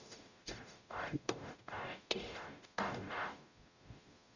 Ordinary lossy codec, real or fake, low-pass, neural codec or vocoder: none; fake; 7.2 kHz; codec, 44.1 kHz, 0.9 kbps, DAC